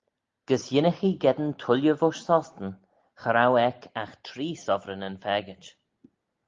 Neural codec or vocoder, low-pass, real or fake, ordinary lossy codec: none; 7.2 kHz; real; Opus, 32 kbps